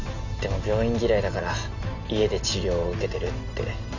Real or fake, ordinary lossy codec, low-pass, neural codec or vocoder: real; none; 7.2 kHz; none